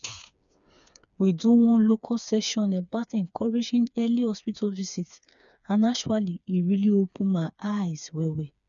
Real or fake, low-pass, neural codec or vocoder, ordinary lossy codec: fake; 7.2 kHz; codec, 16 kHz, 4 kbps, FreqCodec, smaller model; none